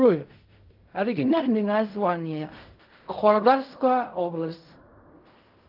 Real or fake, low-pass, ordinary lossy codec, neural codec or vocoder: fake; 5.4 kHz; Opus, 32 kbps; codec, 16 kHz in and 24 kHz out, 0.4 kbps, LongCat-Audio-Codec, fine tuned four codebook decoder